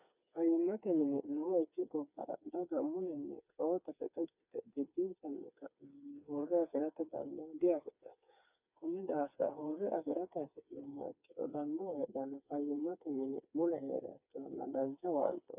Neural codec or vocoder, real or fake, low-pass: codec, 16 kHz, 4 kbps, FreqCodec, smaller model; fake; 3.6 kHz